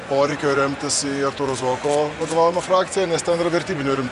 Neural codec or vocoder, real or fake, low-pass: vocoder, 24 kHz, 100 mel bands, Vocos; fake; 10.8 kHz